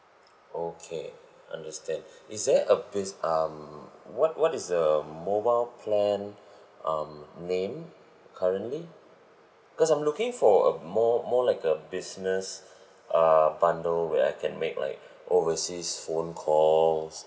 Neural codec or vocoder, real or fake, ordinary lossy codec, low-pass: none; real; none; none